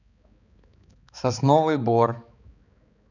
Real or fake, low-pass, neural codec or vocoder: fake; 7.2 kHz; codec, 16 kHz, 4 kbps, X-Codec, HuBERT features, trained on balanced general audio